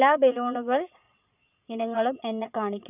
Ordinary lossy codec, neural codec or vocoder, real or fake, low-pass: none; vocoder, 22.05 kHz, 80 mel bands, Vocos; fake; 3.6 kHz